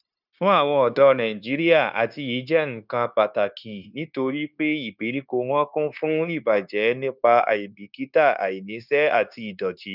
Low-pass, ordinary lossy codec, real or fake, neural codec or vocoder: 5.4 kHz; none; fake; codec, 16 kHz, 0.9 kbps, LongCat-Audio-Codec